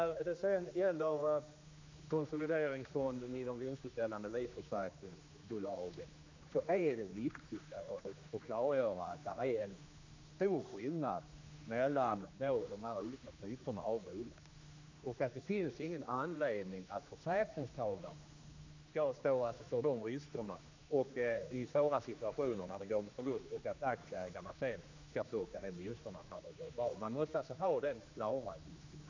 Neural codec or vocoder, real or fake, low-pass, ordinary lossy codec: codec, 16 kHz, 2 kbps, X-Codec, HuBERT features, trained on general audio; fake; 7.2 kHz; MP3, 64 kbps